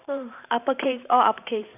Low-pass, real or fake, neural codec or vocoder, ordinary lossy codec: 3.6 kHz; fake; vocoder, 44.1 kHz, 128 mel bands every 512 samples, BigVGAN v2; none